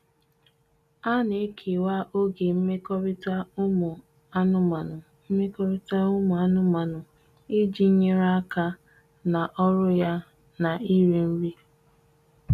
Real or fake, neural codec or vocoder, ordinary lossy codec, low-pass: real; none; none; 14.4 kHz